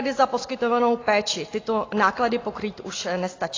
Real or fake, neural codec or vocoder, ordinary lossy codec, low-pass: real; none; AAC, 32 kbps; 7.2 kHz